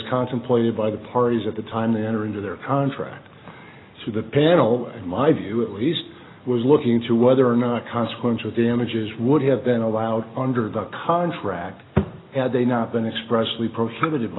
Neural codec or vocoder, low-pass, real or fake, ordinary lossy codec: none; 7.2 kHz; real; AAC, 16 kbps